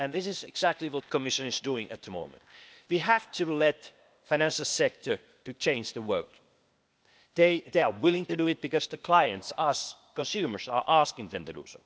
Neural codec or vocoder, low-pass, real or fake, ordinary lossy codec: codec, 16 kHz, 0.8 kbps, ZipCodec; none; fake; none